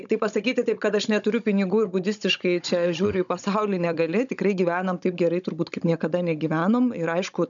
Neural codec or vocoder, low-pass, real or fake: codec, 16 kHz, 16 kbps, FunCodec, trained on Chinese and English, 50 frames a second; 7.2 kHz; fake